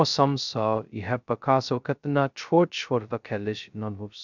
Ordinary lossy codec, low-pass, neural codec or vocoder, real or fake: none; 7.2 kHz; codec, 16 kHz, 0.2 kbps, FocalCodec; fake